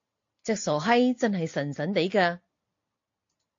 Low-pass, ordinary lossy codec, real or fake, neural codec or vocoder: 7.2 kHz; AAC, 48 kbps; real; none